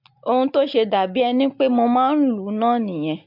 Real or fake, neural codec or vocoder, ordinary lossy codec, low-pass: real; none; MP3, 48 kbps; 5.4 kHz